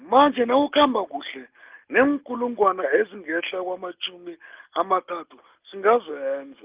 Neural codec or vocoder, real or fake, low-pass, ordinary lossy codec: none; real; 3.6 kHz; Opus, 32 kbps